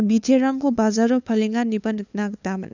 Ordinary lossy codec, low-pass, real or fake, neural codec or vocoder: none; 7.2 kHz; fake; codec, 16 kHz, 2 kbps, FunCodec, trained on LibriTTS, 25 frames a second